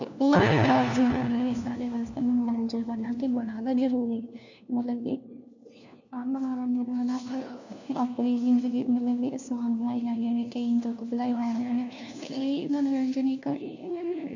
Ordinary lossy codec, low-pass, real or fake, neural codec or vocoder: none; 7.2 kHz; fake; codec, 16 kHz, 1 kbps, FunCodec, trained on LibriTTS, 50 frames a second